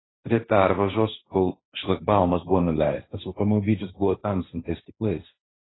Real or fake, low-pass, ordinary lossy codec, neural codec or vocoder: fake; 7.2 kHz; AAC, 16 kbps; codec, 16 kHz, 1.1 kbps, Voila-Tokenizer